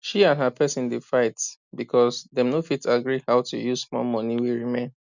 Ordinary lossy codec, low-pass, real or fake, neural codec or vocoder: none; 7.2 kHz; real; none